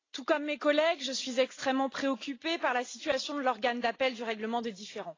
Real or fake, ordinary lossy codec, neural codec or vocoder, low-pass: real; AAC, 32 kbps; none; 7.2 kHz